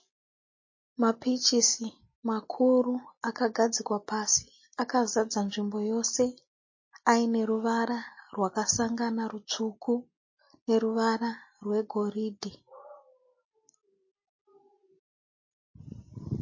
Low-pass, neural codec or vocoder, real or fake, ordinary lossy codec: 7.2 kHz; none; real; MP3, 32 kbps